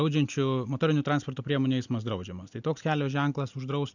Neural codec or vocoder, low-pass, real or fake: none; 7.2 kHz; real